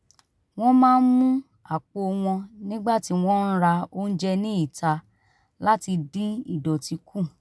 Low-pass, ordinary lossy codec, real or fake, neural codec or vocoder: none; none; real; none